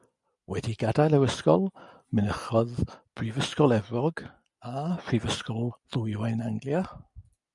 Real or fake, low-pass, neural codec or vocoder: real; 10.8 kHz; none